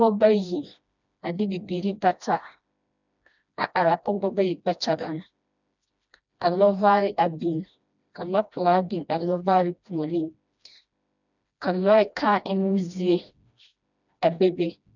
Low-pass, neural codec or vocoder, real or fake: 7.2 kHz; codec, 16 kHz, 1 kbps, FreqCodec, smaller model; fake